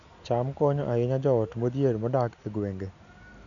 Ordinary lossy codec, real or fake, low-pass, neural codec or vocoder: none; real; 7.2 kHz; none